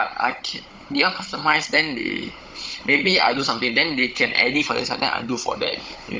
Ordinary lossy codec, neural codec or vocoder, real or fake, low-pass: none; codec, 16 kHz, 16 kbps, FunCodec, trained on Chinese and English, 50 frames a second; fake; none